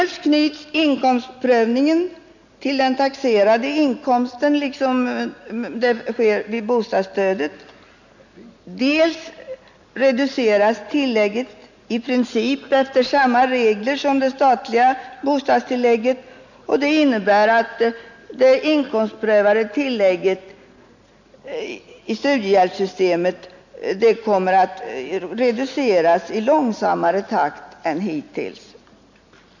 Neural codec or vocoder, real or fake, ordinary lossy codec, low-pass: none; real; none; 7.2 kHz